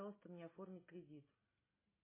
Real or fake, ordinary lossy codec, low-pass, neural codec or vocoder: real; MP3, 16 kbps; 3.6 kHz; none